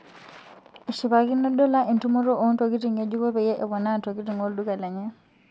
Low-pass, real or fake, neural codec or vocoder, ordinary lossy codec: none; real; none; none